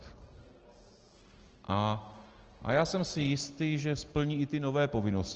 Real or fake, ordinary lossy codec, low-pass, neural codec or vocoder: real; Opus, 16 kbps; 7.2 kHz; none